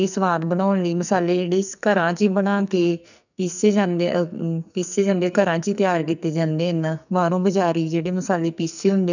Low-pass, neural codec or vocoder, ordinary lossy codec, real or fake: 7.2 kHz; codec, 44.1 kHz, 2.6 kbps, SNAC; none; fake